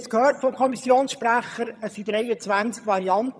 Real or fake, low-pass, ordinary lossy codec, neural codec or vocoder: fake; none; none; vocoder, 22.05 kHz, 80 mel bands, HiFi-GAN